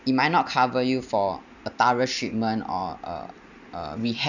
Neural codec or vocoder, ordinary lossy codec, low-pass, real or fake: none; none; 7.2 kHz; real